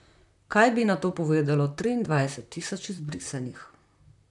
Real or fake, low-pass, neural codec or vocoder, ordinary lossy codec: fake; 10.8 kHz; vocoder, 44.1 kHz, 128 mel bands, Pupu-Vocoder; none